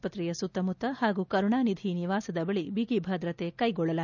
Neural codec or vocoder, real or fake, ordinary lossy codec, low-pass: none; real; none; 7.2 kHz